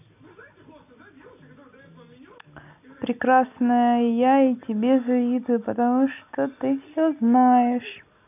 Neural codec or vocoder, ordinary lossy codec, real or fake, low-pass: none; none; real; 3.6 kHz